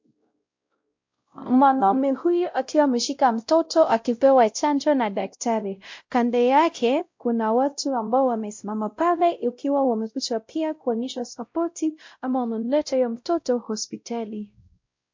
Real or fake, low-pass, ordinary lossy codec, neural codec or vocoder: fake; 7.2 kHz; MP3, 48 kbps; codec, 16 kHz, 0.5 kbps, X-Codec, WavLM features, trained on Multilingual LibriSpeech